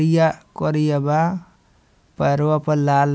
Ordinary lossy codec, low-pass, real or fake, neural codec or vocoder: none; none; real; none